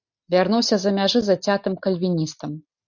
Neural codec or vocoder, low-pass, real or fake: none; 7.2 kHz; real